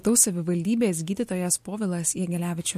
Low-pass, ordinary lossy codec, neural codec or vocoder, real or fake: 14.4 kHz; MP3, 64 kbps; none; real